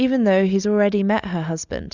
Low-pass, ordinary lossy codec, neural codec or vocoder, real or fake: 7.2 kHz; Opus, 64 kbps; none; real